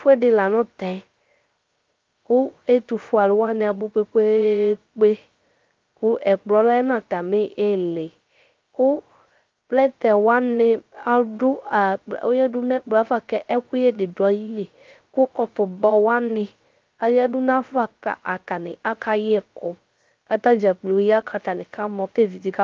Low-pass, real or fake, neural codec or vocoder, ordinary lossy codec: 7.2 kHz; fake; codec, 16 kHz, 0.3 kbps, FocalCodec; Opus, 24 kbps